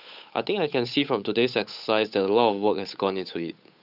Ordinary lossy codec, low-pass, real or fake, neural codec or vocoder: none; 5.4 kHz; fake; codec, 16 kHz, 8 kbps, FunCodec, trained on Chinese and English, 25 frames a second